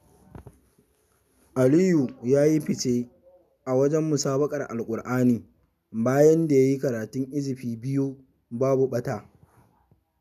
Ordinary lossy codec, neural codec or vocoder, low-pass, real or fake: none; none; 14.4 kHz; real